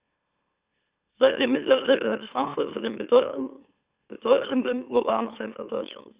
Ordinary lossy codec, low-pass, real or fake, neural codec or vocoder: Opus, 64 kbps; 3.6 kHz; fake; autoencoder, 44.1 kHz, a latent of 192 numbers a frame, MeloTTS